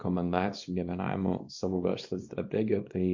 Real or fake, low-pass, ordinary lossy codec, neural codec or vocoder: fake; 7.2 kHz; MP3, 48 kbps; codec, 24 kHz, 0.9 kbps, WavTokenizer, small release